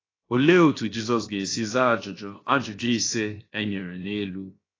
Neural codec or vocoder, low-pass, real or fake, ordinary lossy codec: codec, 16 kHz, 0.7 kbps, FocalCodec; 7.2 kHz; fake; AAC, 32 kbps